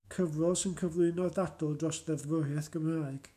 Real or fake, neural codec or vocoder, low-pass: fake; autoencoder, 48 kHz, 128 numbers a frame, DAC-VAE, trained on Japanese speech; 14.4 kHz